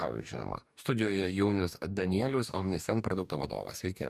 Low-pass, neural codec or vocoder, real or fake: 14.4 kHz; codec, 44.1 kHz, 2.6 kbps, DAC; fake